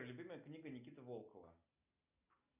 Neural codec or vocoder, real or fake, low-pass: none; real; 3.6 kHz